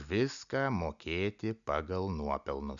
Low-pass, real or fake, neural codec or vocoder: 7.2 kHz; real; none